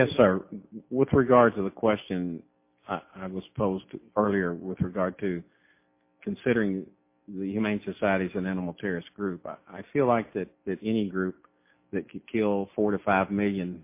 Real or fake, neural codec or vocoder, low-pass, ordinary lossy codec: real; none; 3.6 kHz; MP3, 24 kbps